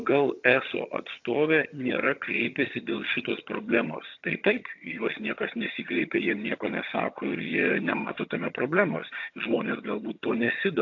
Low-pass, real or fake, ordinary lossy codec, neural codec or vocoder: 7.2 kHz; fake; AAC, 48 kbps; vocoder, 22.05 kHz, 80 mel bands, HiFi-GAN